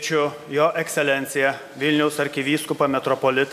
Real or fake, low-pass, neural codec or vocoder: real; 14.4 kHz; none